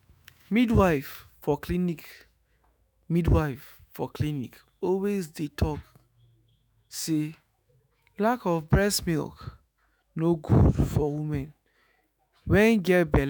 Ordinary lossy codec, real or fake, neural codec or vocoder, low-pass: none; fake; autoencoder, 48 kHz, 128 numbers a frame, DAC-VAE, trained on Japanese speech; none